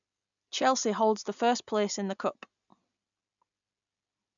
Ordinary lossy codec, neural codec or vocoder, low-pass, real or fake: none; none; 7.2 kHz; real